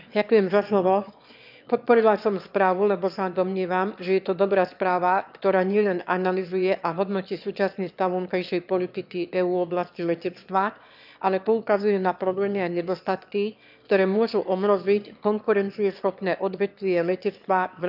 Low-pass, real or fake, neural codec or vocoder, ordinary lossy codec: 5.4 kHz; fake; autoencoder, 22.05 kHz, a latent of 192 numbers a frame, VITS, trained on one speaker; none